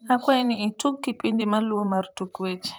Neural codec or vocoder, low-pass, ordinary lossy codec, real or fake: vocoder, 44.1 kHz, 128 mel bands, Pupu-Vocoder; none; none; fake